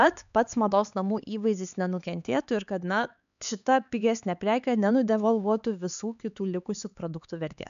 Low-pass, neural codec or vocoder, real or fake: 7.2 kHz; codec, 16 kHz, 4 kbps, X-Codec, HuBERT features, trained on LibriSpeech; fake